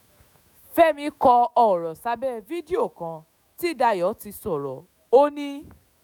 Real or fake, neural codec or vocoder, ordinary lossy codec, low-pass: fake; autoencoder, 48 kHz, 128 numbers a frame, DAC-VAE, trained on Japanese speech; none; none